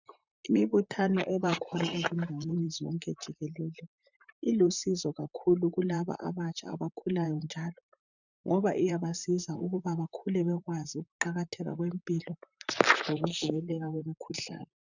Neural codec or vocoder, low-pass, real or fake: vocoder, 44.1 kHz, 128 mel bands, Pupu-Vocoder; 7.2 kHz; fake